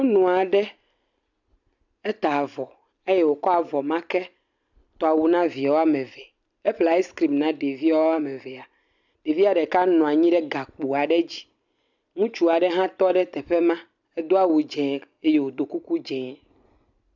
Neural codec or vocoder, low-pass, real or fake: none; 7.2 kHz; real